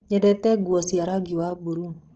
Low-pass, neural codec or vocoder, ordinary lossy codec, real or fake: 7.2 kHz; codec, 16 kHz, 16 kbps, FreqCodec, larger model; Opus, 16 kbps; fake